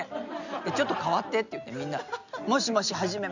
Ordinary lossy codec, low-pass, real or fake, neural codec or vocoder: none; 7.2 kHz; real; none